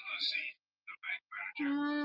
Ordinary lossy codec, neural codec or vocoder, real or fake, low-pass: Opus, 32 kbps; none; real; 5.4 kHz